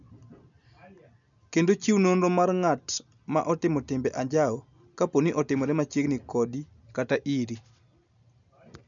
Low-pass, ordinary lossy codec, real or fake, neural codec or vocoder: 7.2 kHz; none; real; none